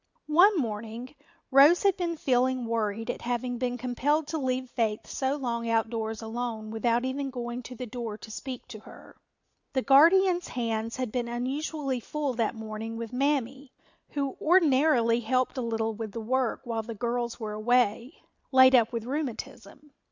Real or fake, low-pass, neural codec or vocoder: real; 7.2 kHz; none